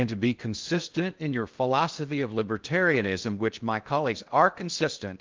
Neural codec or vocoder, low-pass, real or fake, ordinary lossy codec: codec, 16 kHz in and 24 kHz out, 0.6 kbps, FocalCodec, streaming, 2048 codes; 7.2 kHz; fake; Opus, 24 kbps